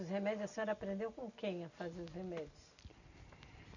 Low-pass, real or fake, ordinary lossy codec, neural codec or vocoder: 7.2 kHz; real; none; none